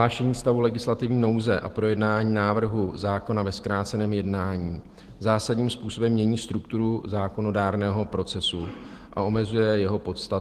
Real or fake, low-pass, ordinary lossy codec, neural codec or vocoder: fake; 14.4 kHz; Opus, 16 kbps; autoencoder, 48 kHz, 128 numbers a frame, DAC-VAE, trained on Japanese speech